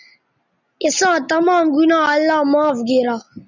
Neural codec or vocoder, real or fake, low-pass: none; real; 7.2 kHz